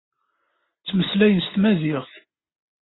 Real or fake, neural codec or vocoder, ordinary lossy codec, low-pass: real; none; AAC, 16 kbps; 7.2 kHz